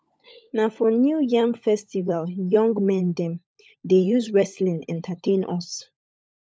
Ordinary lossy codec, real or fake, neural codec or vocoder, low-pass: none; fake; codec, 16 kHz, 16 kbps, FunCodec, trained on LibriTTS, 50 frames a second; none